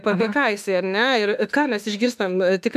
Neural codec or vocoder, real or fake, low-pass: autoencoder, 48 kHz, 32 numbers a frame, DAC-VAE, trained on Japanese speech; fake; 14.4 kHz